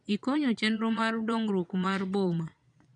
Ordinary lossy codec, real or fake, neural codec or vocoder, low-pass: none; fake; vocoder, 22.05 kHz, 80 mel bands, Vocos; 9.9 kHz